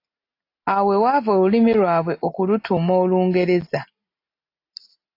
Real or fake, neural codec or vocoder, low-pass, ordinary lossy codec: real; none; 5.4 kHz; AAC, 32 kbps